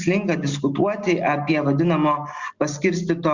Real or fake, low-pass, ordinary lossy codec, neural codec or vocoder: real; 7.2 kHz; Opus, 64 kbps; none